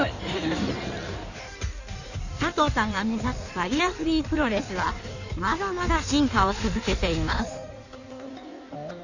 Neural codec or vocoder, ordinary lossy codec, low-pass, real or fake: codec, 16 kHz in and 24 kHz out, 1.1 kbps, FireRedTTS-2 codec; none; 7.2 kHz; fake